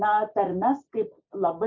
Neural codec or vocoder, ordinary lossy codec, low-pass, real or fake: none; MP3, 64 kbps; 7.2 kHz; real